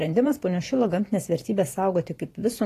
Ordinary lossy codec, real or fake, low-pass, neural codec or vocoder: AAC, 48 kbps; fake; 14.4 kHz; vocoder, 44.1 kHz, 128 mel bands every 512 samples, BigVGAN v2